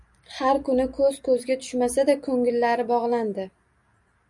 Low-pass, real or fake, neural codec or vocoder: 10.8 kHz; real; none